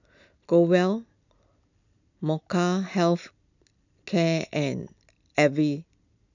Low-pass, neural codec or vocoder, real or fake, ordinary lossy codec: 7.2 kHz; none; real; none